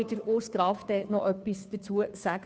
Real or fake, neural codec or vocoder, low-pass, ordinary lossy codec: fake; codec, 16 kHz, 2 kbps, FunCodec, trained on Chinese and English, 25 frames a second; none; none